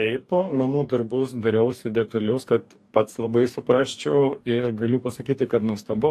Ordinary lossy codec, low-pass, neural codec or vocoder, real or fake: AAC, 64 kbps; 14.4 kHz; codec, 44.1 kHz, 2.6 kbps, DAC; fake